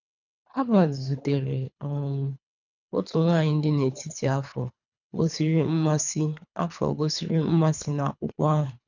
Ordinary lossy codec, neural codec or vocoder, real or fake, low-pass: none; codec, 24 kHz, 3 kbps, HILCodec; fake; 7.2 kHz